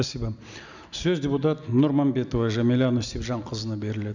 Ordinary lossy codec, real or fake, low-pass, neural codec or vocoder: none; real; 7.2 kHz; none